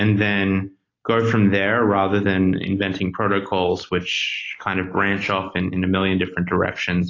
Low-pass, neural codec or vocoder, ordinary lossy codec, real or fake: 7.2 kHz; none; AAC, 32 kbps; real